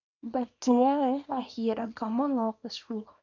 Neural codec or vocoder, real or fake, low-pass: codec, 24 kHz, 0.9 kbps, WavTokenizer, small release; fake; 7.2 kHz